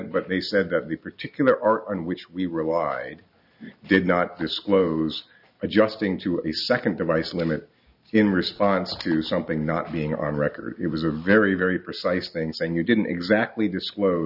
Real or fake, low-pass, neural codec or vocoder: real; 5.4 kHz; none